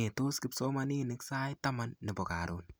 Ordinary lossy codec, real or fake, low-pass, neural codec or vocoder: none; real; none; none